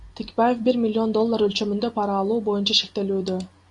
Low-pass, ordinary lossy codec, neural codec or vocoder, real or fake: 10.8 kHz; AAC, 64 kbps; none; real